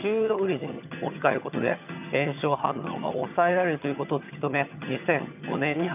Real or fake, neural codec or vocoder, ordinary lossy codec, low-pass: fake; vocoder, 22.05 kHz, 80 mel bands, HiFi-GAN; none; 3.6 kHz